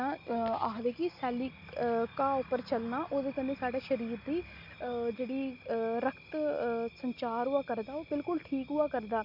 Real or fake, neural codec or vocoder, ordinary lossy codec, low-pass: real; none; none; 5.4 kHz